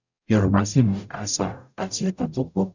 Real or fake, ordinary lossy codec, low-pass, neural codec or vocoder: fake; none; 7.2 kHz; codec, 44.1 kHz, 0.9 kbps, DAC